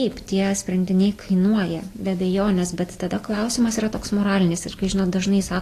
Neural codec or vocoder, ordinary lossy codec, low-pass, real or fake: none; AAC, 48 kbps; 14.4 kHz; real